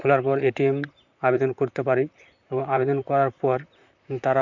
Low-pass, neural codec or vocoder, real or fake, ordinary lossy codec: 7.2 kHz; vocoder, 44.1 kHz, 128 mel bands, Pupu-Vocoder; fake; none